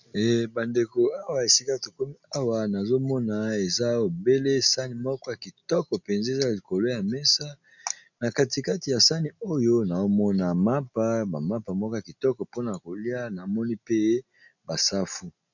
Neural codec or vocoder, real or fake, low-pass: none; real; 7.2 kHz